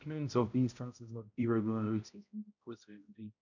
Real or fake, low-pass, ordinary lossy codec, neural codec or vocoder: fake; 7.2 kHz; none; codec, 16 kHz, 0.5 kbps, X-Codec, HuBERT features, trained on balanced general audio